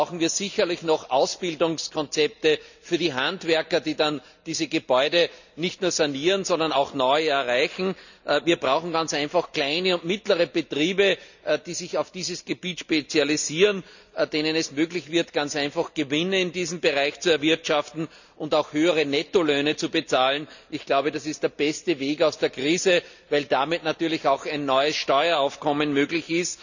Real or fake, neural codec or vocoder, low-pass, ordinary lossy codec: real; none; 7.2 kHz; none